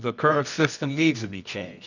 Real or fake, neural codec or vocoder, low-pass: fake; codec, 24 kHz, 0.9 kbps, WavTokenizer, medium music audio release; 7.2 kHz